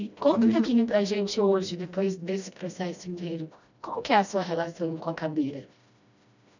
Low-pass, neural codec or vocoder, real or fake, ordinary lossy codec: 7.2 kHz; codec, 16 kHz, 1 kbps, FreqCodec, smaller model; fake; none